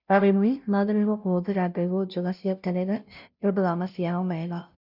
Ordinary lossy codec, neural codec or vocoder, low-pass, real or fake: none; codec, 16 kHz, 0.5 kbps, FunCodec, trained on Chinese and English, 25 frames a second; 5.4 kHz; fake